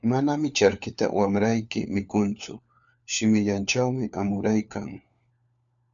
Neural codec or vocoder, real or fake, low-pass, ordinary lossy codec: codec, 16 kHz, 4 kbps, FunCodec, trained on LibriTTS, 50 frames a second; fake; 7.2 kHz; MP3, 96 kbps